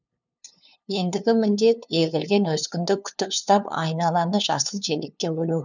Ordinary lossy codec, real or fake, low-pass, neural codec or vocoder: none; fake; 7.2 kHz; codec, 16 kHz, 2 kbps, FunCodec, trained on LibriTTS, 25 frames a second